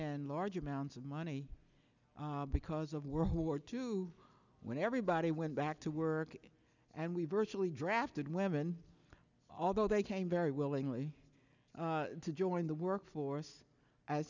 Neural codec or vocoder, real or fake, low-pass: none; real; 7.2 kHz